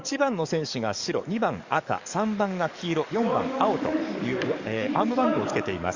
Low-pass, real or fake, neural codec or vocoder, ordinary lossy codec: 7.2 kHz; fake; codec, 44.1 kHz, 7.8 kbps, DAC; Opus, 64 kbps